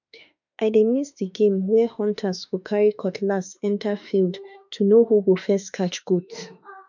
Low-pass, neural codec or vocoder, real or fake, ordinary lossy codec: 7.2 kHz; autoencoder, 48 kHz, 32 numbers a frame, DAC-VAE, trained on Japanese speech; fake; none